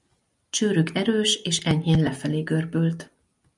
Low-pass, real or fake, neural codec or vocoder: 10.8 kHz; real; none